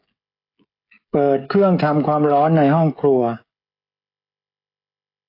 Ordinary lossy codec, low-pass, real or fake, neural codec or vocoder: AAC, 24 kbps; 5.4 kHz; fake; codec, 16 kHz, 16 kbps, FreqCodec, smaller model